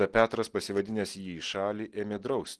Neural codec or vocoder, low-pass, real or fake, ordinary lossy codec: none; 10.8 kHz; real; Opus, 16 kbps